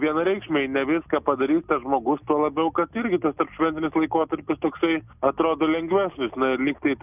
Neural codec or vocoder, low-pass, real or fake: none; 3.6 kHz; real